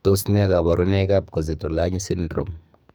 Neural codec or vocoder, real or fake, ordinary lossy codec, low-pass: codec, 44.1 kHz, 2.6 kbps, SNAC; fake; none; none